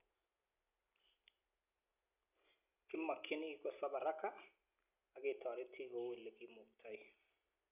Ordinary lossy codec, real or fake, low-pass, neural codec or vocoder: none; real; 3.6 kHz; none